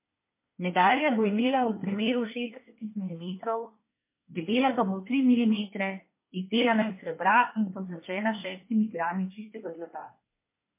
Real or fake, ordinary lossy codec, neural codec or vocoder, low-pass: fake; MP3, 24 kbps; codec, 24 kHz, 1 kbps, SNAC; 3.6 kHz